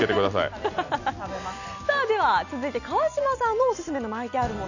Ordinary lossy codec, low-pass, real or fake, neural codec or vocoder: none; 7.2 kHz; real; none